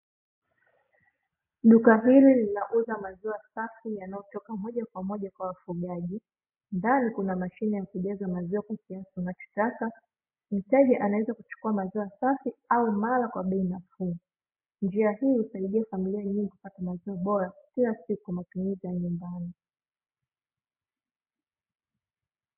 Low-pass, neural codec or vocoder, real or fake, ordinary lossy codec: 3.6 kHz; none; real; MP3, 24 kbps